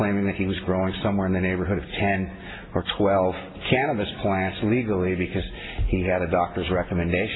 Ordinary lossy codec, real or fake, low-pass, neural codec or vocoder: AAC, 16 kbps; real; 7.2 kHz; none